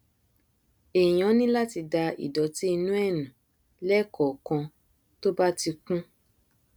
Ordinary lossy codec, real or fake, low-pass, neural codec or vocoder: none; real; none; none